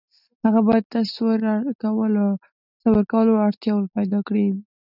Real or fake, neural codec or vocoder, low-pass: real; none; 5.4 kHz